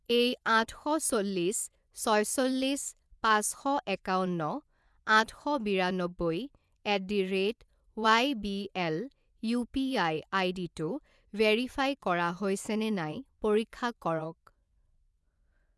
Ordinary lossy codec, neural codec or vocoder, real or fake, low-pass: none; vocoder, 24 kHz, 100 mel bands, Vocos; fake; none